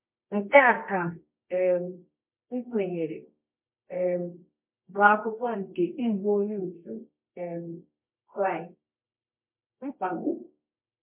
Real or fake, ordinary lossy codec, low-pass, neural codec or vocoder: fake; MP3, 32 kbps; 3.6 kHz; codec, 24 kHz, 0.9 kbps, WavTokenizer, medium music audio release